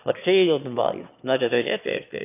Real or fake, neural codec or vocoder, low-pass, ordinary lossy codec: fake; autoencoder, 22.05 kHz, a latent of 192 numbers a frame, VITS, trained on one speaker; 3.6 kHz; none